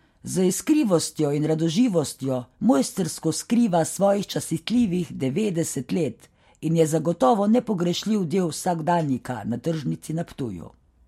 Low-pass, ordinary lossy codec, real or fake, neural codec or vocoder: 19.8 kHz; MP3, 64 kbps; real; none